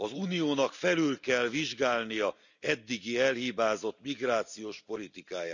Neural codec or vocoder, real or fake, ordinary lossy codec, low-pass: none; real; none; 7.2 kHz